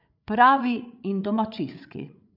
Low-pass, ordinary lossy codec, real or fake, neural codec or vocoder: 5.4 kHz; none; fake; codec, 16 kHz, 8 kbps, FreqCodec, larger model